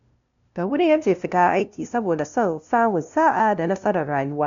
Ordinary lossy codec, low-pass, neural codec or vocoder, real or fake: MP3, 64 kbps; 7.2 kHz; codec, 16 kHz, 0.5 kbps, FunCodec, trained on LibriTTS, 25 frames a second; fake